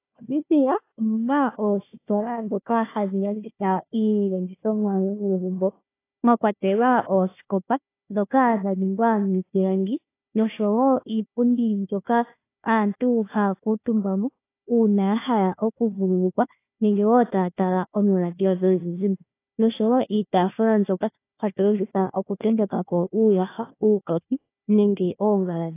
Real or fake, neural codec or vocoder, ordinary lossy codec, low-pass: fake; codec, 16 kHz, 1 kbps, FunCodec, trained on Chinese and English, 50 frames a second; AAC, 24 kbps; 3.6 kHz